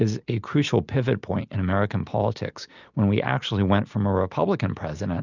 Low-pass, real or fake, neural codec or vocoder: 7.2 kHz; real; none